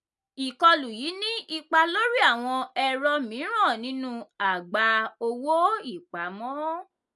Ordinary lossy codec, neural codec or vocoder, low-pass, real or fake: none; none; none; real